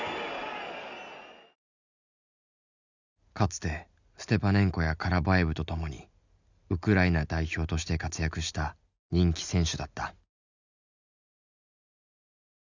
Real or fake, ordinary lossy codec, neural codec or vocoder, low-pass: real; none; none; 7.2 kHz